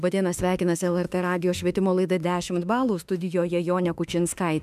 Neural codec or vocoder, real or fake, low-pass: autoencoder, 48 kHz, 32 numbers a frame, DAC-VAE, trained on Japanese speech; fake; 14.4 kHz